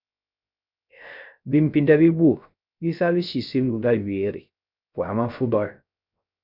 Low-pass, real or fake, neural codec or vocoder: 5.4 kHz; fake; codec, 16 kHz, 0.3 kbps, FocalCodec